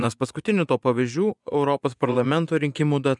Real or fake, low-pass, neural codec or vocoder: fake; 10.8 kHz; vocoder, 24 kHz, 100 mel bands, Vocos